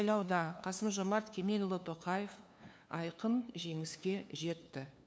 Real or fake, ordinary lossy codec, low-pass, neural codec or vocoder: fake; none; none; codec, 16 kHz, 2 kbps, FunCodec, trained on LibriTTS, 25 frames a second